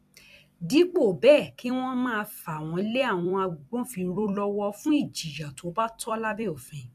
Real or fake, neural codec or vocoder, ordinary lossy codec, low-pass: fake; vocoder, 44.1 kHz, 128 mel bands every 256 samples, BigVGAN v2; AAC, 96 kbps; 14.4 kHz